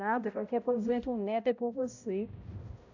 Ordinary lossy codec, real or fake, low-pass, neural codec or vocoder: AAC, 48 kbps; fake; 7.2 kHz; codec, 16 kHz, 0.5 kbps, X-Codec, HuBERT features, trained on balanced general audio